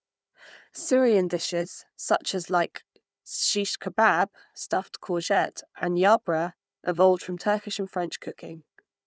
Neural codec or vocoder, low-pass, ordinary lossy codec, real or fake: codec, 16 kHz, 4 kbps, FunCodec, trained on Chinese and English, 50 frames a second; none; none; fake